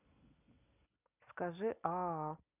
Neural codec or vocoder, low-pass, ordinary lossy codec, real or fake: none; 3.6 kHz; none; real